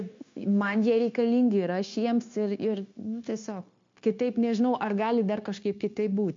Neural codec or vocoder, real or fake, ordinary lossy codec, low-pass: codec, 16 kHz, 0.9 kbps, LongCat-Audio-Codec; fake; MP3, 48 kbps; 7.2 kHz